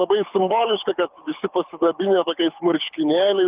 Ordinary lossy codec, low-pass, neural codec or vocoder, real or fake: Opus, 32 kbps; 3.6 kHz; codec, 24 kHz, 6 kbps, HILCodec; fake